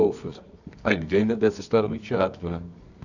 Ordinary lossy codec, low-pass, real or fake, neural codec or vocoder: none; 7.2 kHz; fake; codec, 24 kHz, 0.9 kbps, WavTokenizer, medium music audio release